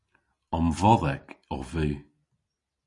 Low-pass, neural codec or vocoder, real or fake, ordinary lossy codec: 10.8 kHz; none; real; MP3, 64 kbps